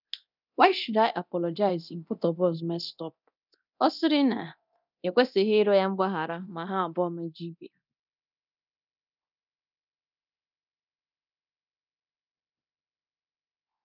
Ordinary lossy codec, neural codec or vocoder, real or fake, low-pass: none; codec, 24 kHz, 0.9 kbps, DualCodec; fake; 5.4 kHz